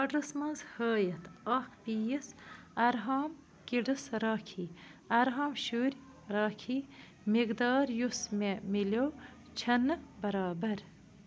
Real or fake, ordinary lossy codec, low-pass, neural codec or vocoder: real; none; none; none